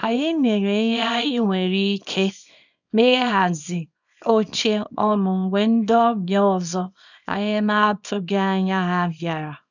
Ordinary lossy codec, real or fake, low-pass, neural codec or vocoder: none; fake; 7.2 kHz; codec, 24 kHz, 0.9 kbps, WavTokenizer, small release